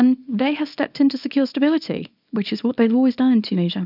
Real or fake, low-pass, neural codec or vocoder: fake; 5.4 kHz; codec, 24 kHz, 0.9 kbps, WavTokenizer, small release